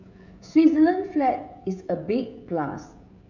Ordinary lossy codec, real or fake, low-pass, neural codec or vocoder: none; fake; 7.2 kHz; codec, 16 kHz, 16 kbps, FreqCodec, smaller model